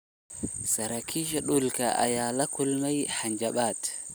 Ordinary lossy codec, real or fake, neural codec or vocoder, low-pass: none; real; none; none